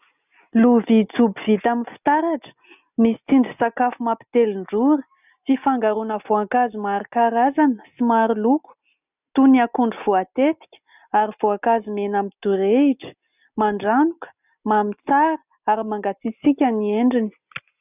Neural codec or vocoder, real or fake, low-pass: none; real; 3.6 kHz